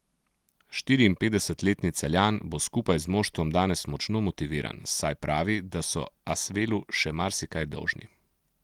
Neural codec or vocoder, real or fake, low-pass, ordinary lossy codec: none; real; 19.8 kHz; Opus, 24 kbps